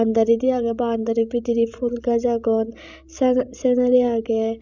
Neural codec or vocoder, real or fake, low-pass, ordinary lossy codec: codec, 16 kHz, 16 kbps, FreqCodec, larger model; fake; 7.2 kHz; none